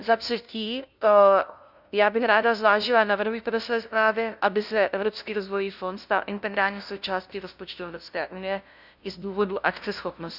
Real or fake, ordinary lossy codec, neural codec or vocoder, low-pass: fake; none; codec, 16 kHz, 0.5 kbps, FunCodec, trained on LibriTTS, 25 frames a second; 5.4 kHz